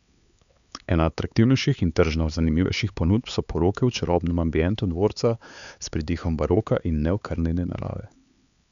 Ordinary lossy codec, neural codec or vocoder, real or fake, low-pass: none; codec, 16 kHz, 4 kbps, X-Codec, HuBERT features, trained on LibriSpeech; fake; 7.2 kHz